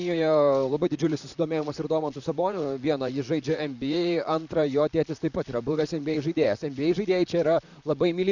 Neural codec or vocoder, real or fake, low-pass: vocoder, 44.1 kHz, 128 mel bands, Pupu-Vocoder; fake; 7.2 kHz